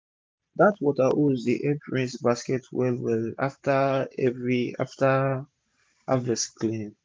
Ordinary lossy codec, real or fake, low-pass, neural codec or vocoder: none; real; none; none